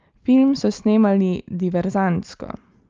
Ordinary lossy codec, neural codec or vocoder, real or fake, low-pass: Opus, 24 kbps; none; real; 7.2 kHz